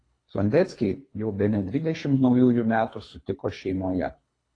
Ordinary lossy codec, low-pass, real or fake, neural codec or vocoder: AAC, 32 kbps; 9.9 kHz; fake; codec, 24 kHz, 3 kbps, HILCodec